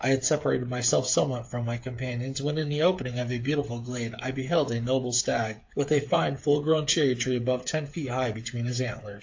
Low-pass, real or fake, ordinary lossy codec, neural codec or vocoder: 7.2 kHz; fake; AAC, 48 kbps; codec, 16 kHz, 8 kbps, FreqCodec, smaller model